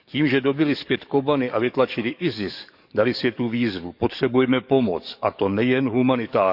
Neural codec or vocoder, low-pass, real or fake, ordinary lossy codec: codec, 44.1 kHz, 7.8 kbps, DAC; 5.4 kHz; fake; none